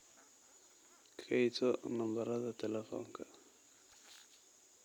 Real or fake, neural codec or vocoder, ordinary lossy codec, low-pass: real; none; none; 19.8 kHz